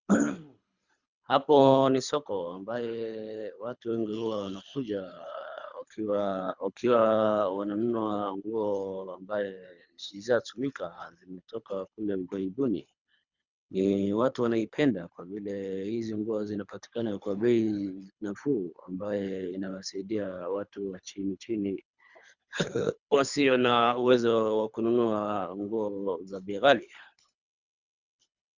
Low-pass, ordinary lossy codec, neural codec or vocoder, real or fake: 7.2 kHz; Opus, 64 kbps; codec, 24 kHz, 3 kbps, HILCodec; fake